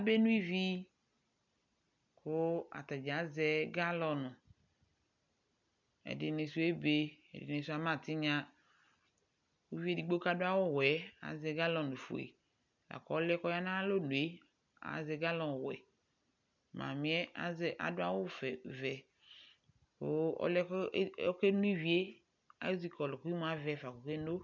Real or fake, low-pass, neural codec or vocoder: real; 7.2 kHz; none